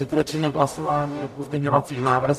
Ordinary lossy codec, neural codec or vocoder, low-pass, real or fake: MP3, 96 kbps; codec, 44.1 kHz, 0.9 kbps, DAC; 14.4 kHz; fake